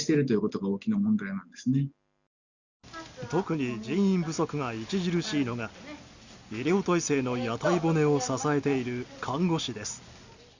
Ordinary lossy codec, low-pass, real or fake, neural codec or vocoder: Opus, 64 kbps; 7.2 kHz; real; none